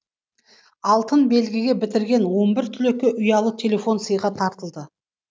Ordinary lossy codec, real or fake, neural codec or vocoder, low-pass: none; real; none; none